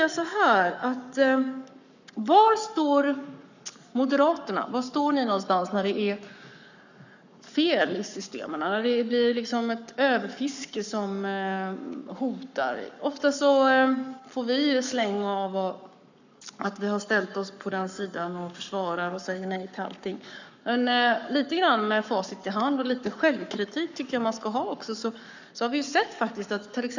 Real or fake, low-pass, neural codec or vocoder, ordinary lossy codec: fake; 7.2 kHz; codec, 44.1 kHz, 7.8 kbps, Pupu-Codec; none